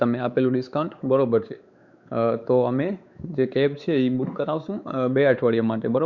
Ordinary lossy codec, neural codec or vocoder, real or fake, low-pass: Opus, 64 kbps; codec, 16 kHz, 4 kbps, X-Codec, WavLM features, trained on Multilingual LibriSpeech; fake; 7.2 kHz